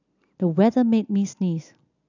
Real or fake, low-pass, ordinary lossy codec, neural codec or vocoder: real; 7.2 kHz; none; none